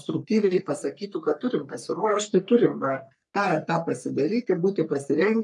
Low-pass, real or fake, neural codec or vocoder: 10.8 kHz; fake; codec, 44.1 kHz, 3.4 kbps, Pupu-Codec